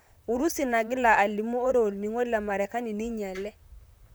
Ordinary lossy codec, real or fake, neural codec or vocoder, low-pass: none; fake; vocoder, 44.1 kHz, 128 mel bands, Pupu-Vocoder; none